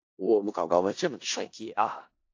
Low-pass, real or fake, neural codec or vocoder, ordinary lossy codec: 7.2 kHz; fake; codec, 16 kHz in and 24 kHz out, 0.4 kbps, LongCat-Audio-Codec, four codebook decoder; AAC, 48 kbps